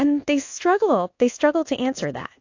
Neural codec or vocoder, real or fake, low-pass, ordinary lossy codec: codec, 24 kHz, 1.2 kbps, DualCodec; fake; 7.2 kHz; AAC, 48 kbps